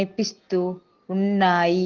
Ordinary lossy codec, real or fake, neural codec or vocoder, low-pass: Opus, 16 kbps; real; none; 7.2 kHz